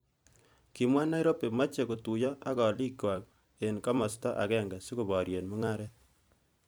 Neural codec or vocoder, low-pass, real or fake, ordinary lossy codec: vocoder, 44.1 kHz, 128 mel bands every 256 samples, BigVGAN v2; none; fake; none